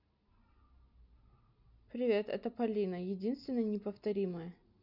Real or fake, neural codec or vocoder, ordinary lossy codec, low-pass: real; none; none; 5.4 kHz